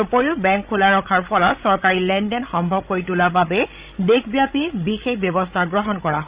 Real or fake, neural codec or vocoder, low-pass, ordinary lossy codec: fake; codec, 16 kHz, 16 kbps, FreqCodec, larger model; 3.6 kHz; Opus, 64 kbps